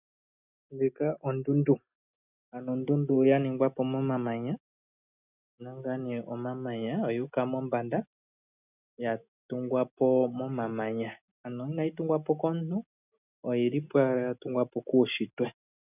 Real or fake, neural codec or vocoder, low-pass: real; none; 3.6 kHz